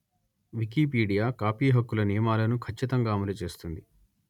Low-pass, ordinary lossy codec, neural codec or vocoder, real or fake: 19.8 kHz; none; none; real